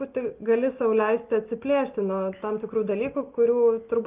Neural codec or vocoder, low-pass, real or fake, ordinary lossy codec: none; 3.6 kHz; real; Opus, 32 kbps